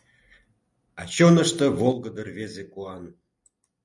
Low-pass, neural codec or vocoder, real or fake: 10.8 kHz; none; real